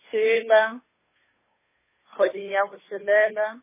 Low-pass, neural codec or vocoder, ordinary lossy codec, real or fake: 3.6 kHz; codec, 16 kHz, 2 kbps, FunCodec, trained on Chinese and English, 25 frames a second; MP3, 16 kbps; fake